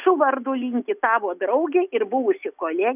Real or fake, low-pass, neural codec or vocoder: real; 3.6 kHz; none